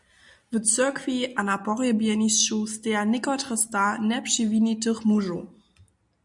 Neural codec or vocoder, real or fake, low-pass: none; real; 10.8 kHz